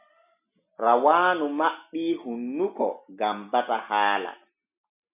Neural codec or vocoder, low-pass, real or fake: none; 3.6 kHz; real